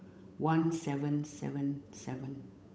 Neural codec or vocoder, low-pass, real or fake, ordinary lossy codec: codec, 16 kHz, 8 kbps, FunCodec, trained on Chinese and English, 25 frames a second; none; fake; none